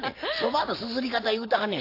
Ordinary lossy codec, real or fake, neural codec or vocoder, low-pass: AAC, 32 kbps; real; none; 5.4 kHz